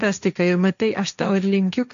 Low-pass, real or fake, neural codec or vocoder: 7.2 kHz; fake; codec, 16 kHz, 1.1 kbps, Voila-Tokenizer